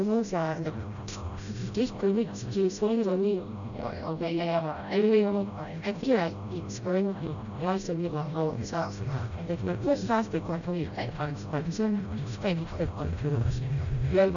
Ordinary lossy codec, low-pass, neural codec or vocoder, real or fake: none; 7.2 kHz; codec, 16 kHz, 0.5 kbps, FreqCodec, smaller model; fake